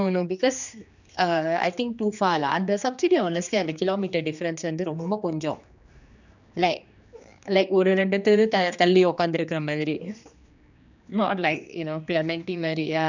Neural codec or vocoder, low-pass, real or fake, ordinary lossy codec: codec, 16 kHz, 2 kbps, X-Codec, HuBERT features, trained on general audio; 7.2 kHz; fake; none